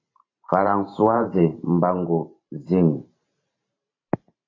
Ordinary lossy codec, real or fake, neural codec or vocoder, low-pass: AAC, 32 kbps; fake; vocoder, 44.1 kHz, 128 mel bands every 512 samples, BigVGAN v2; 7.2 kHz